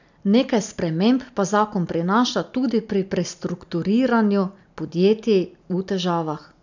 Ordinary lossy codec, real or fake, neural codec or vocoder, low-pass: none; real; none; 7.2 kHz